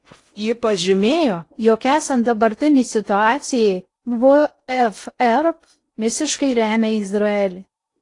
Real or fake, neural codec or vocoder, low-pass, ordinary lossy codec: fake; codec, 16 kHz in and 24 kHz out, 0.6 kbps, FocalCodec, streaming, 4096 codes; 10.8 kHz; AAC, 48 kbps